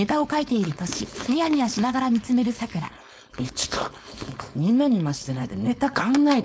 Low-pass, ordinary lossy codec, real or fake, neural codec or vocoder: none; none; fake; codec, 16 kHz, 4.8 kbps, FACodec